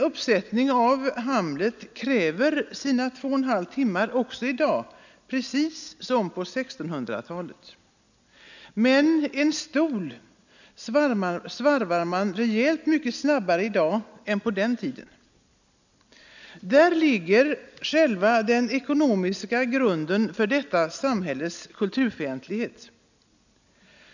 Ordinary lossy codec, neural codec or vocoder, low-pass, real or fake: none; none; 7.2 kHz; real